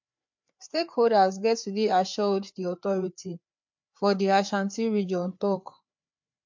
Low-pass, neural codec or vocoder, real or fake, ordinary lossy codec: 7.2 kHz; codec, 16 kHz, 4 kbps, FreqCodec, larger model; fake; MP3, 48 kbps